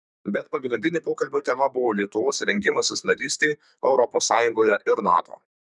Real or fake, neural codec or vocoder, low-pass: fake; codec, 32 kHz, 1.9 kbps, SNAC; 10.8 kHz